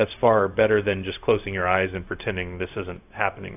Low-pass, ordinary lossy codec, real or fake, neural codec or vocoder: 3.6 kHz; AAC, 32 kbps; fake; codec, 16 kHz, 0.4 kbps, LongCat-Audio-Codec